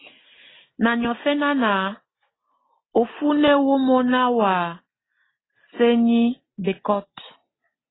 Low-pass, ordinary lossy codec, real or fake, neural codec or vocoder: 7.2 kHz; AAC, 16 kbps; real; none